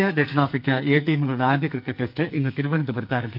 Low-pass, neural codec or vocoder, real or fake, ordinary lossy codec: 5.4 kHz; codec, 44.1 kHz, 2.6 kbps, SNAC; fake; none